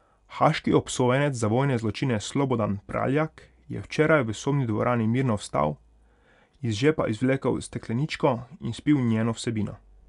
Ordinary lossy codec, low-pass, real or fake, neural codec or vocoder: none; 10.8 kHz; real; none